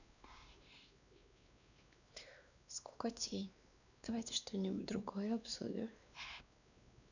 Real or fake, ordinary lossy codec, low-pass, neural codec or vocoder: fake; none; 7.2 kHz; codec, 16 kHz, 2 kbps, X-Codec, WavLM features, trained on Multilingual LibriSpeech